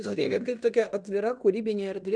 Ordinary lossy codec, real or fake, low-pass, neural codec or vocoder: MP3, 96 kbps; fake; 9.9 kHz; codec, 16 kHz in and 24 kHz out, 0.9 kbps, LongCat-Audio-Codec, fine tuned four codebook decoder